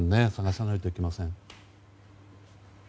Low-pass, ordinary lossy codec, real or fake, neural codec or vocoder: none; none; real; none